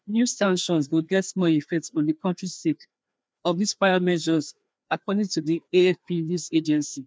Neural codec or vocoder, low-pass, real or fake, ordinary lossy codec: codec, 16 kHz, 2 kbps, FreqCodec, larger model; none; fake; none